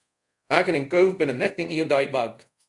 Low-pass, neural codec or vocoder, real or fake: 10.8 kHz; codec, 24 kHz, 0.5 kbps, DualCodec; fake